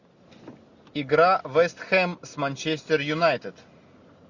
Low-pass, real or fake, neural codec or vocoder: 7.2 kHz; real; none